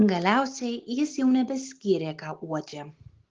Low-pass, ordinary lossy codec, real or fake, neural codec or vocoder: 7.2 kHz; Opus, 16 kbps; real; none